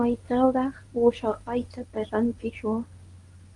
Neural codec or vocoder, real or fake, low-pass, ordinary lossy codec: codec, 24 kHz, 0.9 kbps, WavTokenizer, medium speech release version 1; fake; 10.8 kHz; Opus, 32 kbps